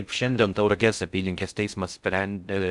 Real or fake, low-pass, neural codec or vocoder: fake; 10.8 kHz; codec, 16 kHz in and 24 kHz out, 0.6 kbps, FocalCodec, streaming, 4096 codes